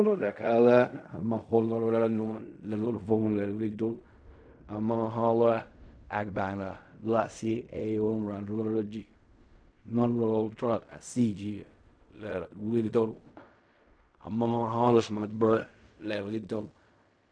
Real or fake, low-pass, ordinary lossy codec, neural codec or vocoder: fake; 9.9 kHz; MP3, 64 kbps; codec, 16 kHz in and 24 kHz out, 0.4 kbps, LongCat-Audio-Codec, fine tuned four codebook decoder